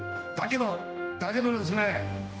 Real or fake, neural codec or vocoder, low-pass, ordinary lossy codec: fake; codec, 16 kHz, 1 kbps, X-Codec, HuBERT features, trained on general audio; none; none